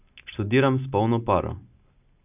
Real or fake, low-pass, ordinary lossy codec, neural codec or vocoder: real; 3.6 kHz; none; none